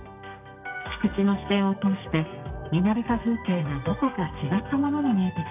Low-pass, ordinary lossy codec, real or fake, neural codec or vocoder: 3.6 kHz; none; fake; codec, 32 kHz, 1.9 kbps, SNAC